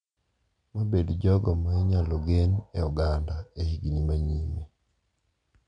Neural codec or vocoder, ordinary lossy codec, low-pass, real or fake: none; none; 9.9 kHz; real